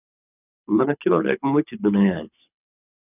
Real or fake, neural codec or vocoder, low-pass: fake; codec, 24 kHz, 6 kbps, HILCodec; 3.6 kHz